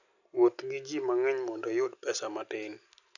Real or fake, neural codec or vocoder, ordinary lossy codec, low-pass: real; none; none; 7.2 kHz